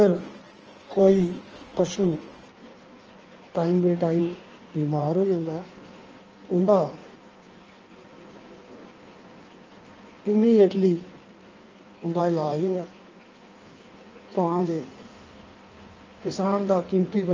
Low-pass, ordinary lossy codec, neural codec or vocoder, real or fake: 7.2 kHz; Opus, 24 kbps; codec, 16 kHz in and 24 kHz out, 1.1 kbps, FireRedTTS-2 codec; fake